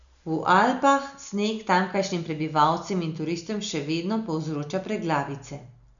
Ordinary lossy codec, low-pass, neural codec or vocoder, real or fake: none; 7.2 kHz; none; real